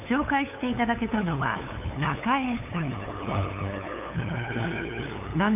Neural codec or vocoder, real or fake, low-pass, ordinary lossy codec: codec, 16 kHz, 8 kbps, FunCodec, trained on LibriTTS, 25 frames a second; fake; 3.6 kHz; AAC, 32 kbps